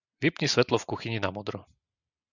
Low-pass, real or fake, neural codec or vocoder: 7.2 kHz; real; none